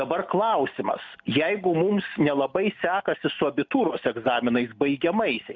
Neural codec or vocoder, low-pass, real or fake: none; 7.2 kHz; real